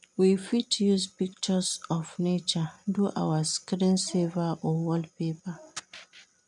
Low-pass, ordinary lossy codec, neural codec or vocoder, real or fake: 10.8 kHz; none; none; real